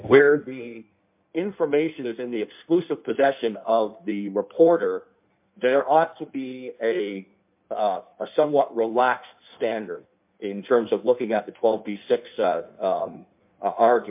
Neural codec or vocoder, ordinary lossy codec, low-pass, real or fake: codec, 16 kHz in and 24 kHz out, 1.1 kbps, FireRedTTS-2 codec; MP3, 32 kbps; 3.6 kHz; fake